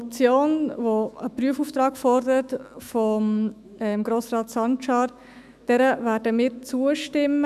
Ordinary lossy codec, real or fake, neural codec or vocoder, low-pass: none; fake; autoencoder, 48 kHz, 128 numbers a frame, DAC-VAE, trained on Japanese speech; 14.4 kHz